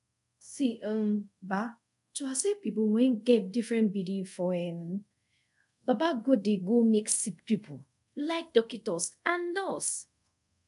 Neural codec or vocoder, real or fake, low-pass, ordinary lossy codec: codec, 24 kHz, 0.5 kbps, DualCodec; fake; 10.8 kHz; none